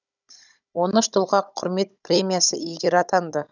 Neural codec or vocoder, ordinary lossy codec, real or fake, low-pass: codec, 16 kHz, 16 kbps, FunCodec, trained on Chinese and English, 50 frames a second; none; fake; 7.2 kHz